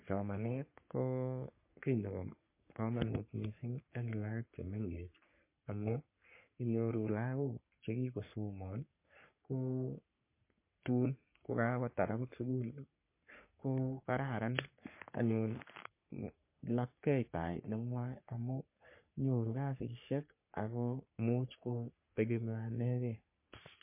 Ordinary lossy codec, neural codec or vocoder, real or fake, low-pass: MP3, 24 kbps; codec, 44.1 kHz, 3.4 kbps, Pupu-Codec; fake; 3.6 kHz